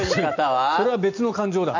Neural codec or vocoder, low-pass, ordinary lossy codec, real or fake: none; 7.2 kHz; none; real